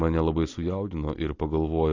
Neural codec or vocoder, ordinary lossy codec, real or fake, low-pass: none; AAC, 32 kbps; real; 7.2 kHz